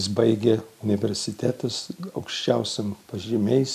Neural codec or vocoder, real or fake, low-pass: none; real; 14.4 kHz